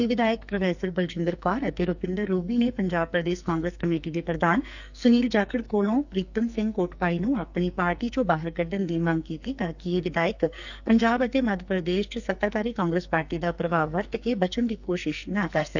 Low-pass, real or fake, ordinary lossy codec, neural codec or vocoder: 7.2 kHz; fake; none; codec, 44.1 kHz, 2.6 kbps, SNAC